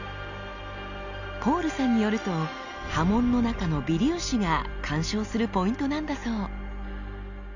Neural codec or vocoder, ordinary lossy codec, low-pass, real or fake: none; none; 7.2 kHz; real